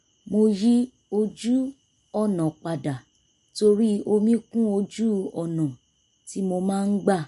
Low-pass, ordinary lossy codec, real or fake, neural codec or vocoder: 14.4 kHz; MP3, 48 kbps; real; none